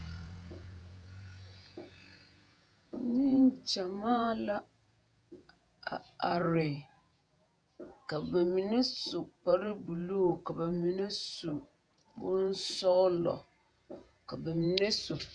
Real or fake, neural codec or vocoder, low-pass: fake; vocoder, 48 kHz, 128 mel bands, Vocos; 9.9 kHz